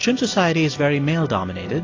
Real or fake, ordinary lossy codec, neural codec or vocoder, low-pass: real; AAC, 32 kbps; none; 7.2 kHz